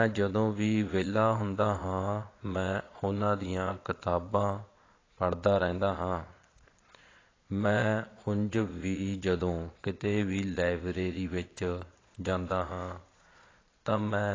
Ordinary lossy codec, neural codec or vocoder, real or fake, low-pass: AAC, 32 kbps; vocoder, 22.05 kHz, 80 mel bands, Vocos; fake; 7.2 kHz